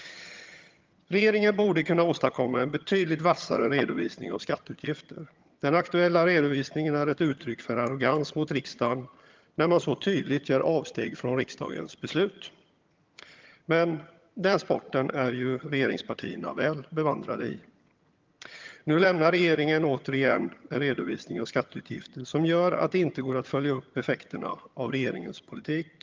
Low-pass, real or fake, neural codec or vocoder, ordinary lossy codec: 7.2 kHz; fake; vocoder, 22.05 kHz, 80 mel bands, HiFi-GAN; Opus, 32 kbps